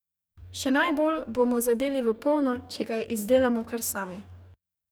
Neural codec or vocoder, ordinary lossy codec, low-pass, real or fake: codec, 44.1 kHz, 2.6 kbps, DAC; none; none; fake